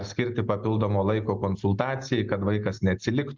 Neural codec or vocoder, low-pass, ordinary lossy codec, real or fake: none; 7.2 kHz; Opus, 24 kbps; real